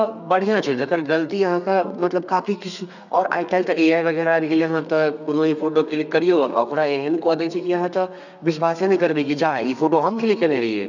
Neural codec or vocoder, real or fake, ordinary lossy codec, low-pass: codec, 32 kHz, 1.9 kbps, SNAC; fake; none; 7.2 kHz